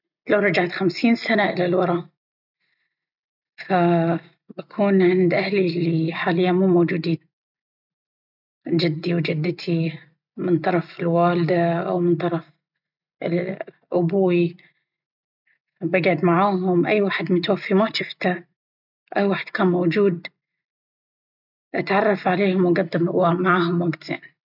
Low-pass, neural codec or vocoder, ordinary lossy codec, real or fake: 5.4 kHz; none; none; real